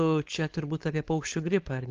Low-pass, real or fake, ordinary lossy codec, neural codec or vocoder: 7.2 kHz; fake; Opus, 16 kbps; codec, 16 kHz, 4.8 kbps, FACodec